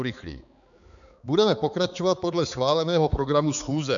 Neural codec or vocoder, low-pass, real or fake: codec, 16 kHz, 4 kbps, X-Codec, HuBERT features, trained on balanced general audio; 7.2 kHz; fake